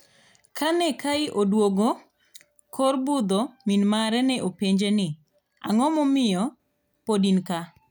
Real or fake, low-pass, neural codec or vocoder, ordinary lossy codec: real; none; none; none